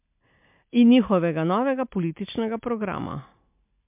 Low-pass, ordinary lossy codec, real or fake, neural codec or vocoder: 3.6 kHz; MP3, 32 kbps; real; none